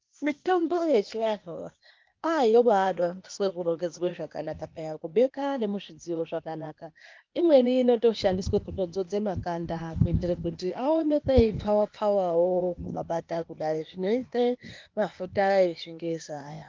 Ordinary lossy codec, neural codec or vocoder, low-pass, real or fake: Opus, 32 kbps; codec, 16 kHz, 0.8 kbps, ZipCodec; 7.2 kHz; fake